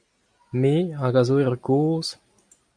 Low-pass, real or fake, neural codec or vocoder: 9.9 kHz; real; none